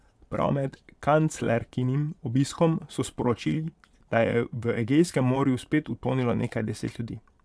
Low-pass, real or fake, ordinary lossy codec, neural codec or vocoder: none; fake; none; vocoder, 22.05 kHz, 80 mel bands, Vocos